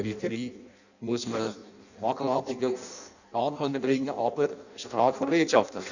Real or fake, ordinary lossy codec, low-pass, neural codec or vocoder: fake; none; 7.2 kHz; codec, 16 kHz in and 24 kHz out, 0.6 kbps, FireRedTTS-2 codec